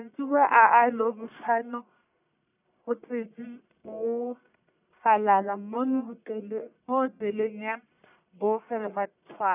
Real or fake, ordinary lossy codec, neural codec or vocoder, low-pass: fake; none; codec, 44.1 kHz, 1.7 kbps, Pupu-Codec; 3.6 kHz